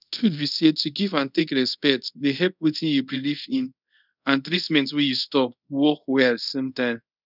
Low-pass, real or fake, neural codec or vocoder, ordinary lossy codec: 5.4 kHz; fake; codec, 24 kHz, 0.5 kbps, DualCodec; none